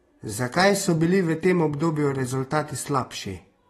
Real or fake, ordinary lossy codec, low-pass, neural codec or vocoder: real; AAC, 32 kbps; 19.8 kHz; none